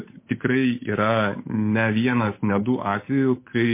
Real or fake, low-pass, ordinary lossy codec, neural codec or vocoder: fake; 3.6 kHz; MP3, 24 kbps; codec, 16 kHz, 8 kbps, FunCodec, trained on Chinese and English, 25 frames a second